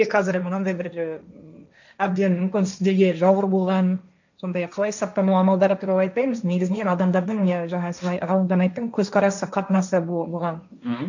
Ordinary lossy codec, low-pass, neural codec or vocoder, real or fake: none; 7.2 kHz; codec, 16 kHz, 1.1 kbps, Voila-Tokenizer; fake